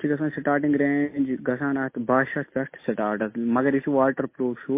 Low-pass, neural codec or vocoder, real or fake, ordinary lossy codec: 3.6 kHz; none; real; MP3, 24 kbps